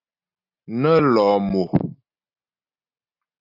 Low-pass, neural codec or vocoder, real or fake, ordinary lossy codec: 5.4 kHz; none; real; AAC, 48 kbps